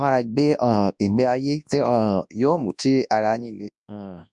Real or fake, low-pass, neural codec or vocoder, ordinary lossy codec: fake; 10.8 kHz; codec, 24 kHz, 0.9 kbps, WavTokenizer, large speech release; MP3, 96 kbps